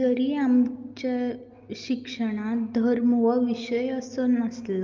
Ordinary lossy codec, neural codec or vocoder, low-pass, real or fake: Opus, 24 kbps; none; 7.2 kHz; real